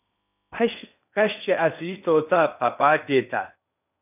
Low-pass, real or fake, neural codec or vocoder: 3.6 kHz; fake; codec, 16 kHz in and 24 kHz out, 0.8 kbps, FocalCodec, streaming, 65536 codes